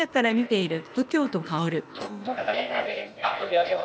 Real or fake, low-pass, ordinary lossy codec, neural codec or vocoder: fake; none; none; codec, 16 kHz, 0.8 kbps, ZipCodec